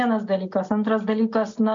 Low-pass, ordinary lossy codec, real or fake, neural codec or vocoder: 7.2 kHz; MP3, 64 kbps; real; none